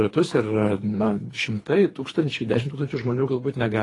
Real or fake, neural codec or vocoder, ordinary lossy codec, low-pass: fake; codec, 24 kHz, 3 kbps, HILCodec; AAC, 32 kbps; 10.8 kHz